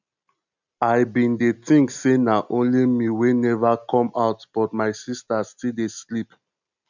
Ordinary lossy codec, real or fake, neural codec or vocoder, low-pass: none; real; none; 7.2 kHz